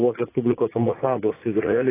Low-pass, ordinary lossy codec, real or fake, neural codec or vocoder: 3.6 kHz; AAC, 16 kbps; fake; vocoder, 44.1 kHz, 80 mel bands, Vocos